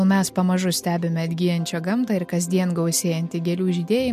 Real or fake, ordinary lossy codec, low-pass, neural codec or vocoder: real; MP3, 64 kbps; 14.4 kHz; none